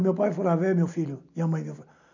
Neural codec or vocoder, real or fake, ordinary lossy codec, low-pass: none; real; none; 7.2 kHz